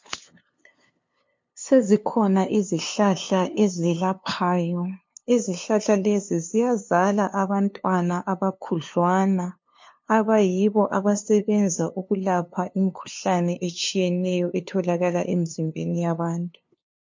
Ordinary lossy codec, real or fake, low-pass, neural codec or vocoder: MP3, 48 kbps; fake; 7.2 kHz; codec, 16 kHz, 2 kbps, FunCodec, trained on LibriTTS, 25 frames a second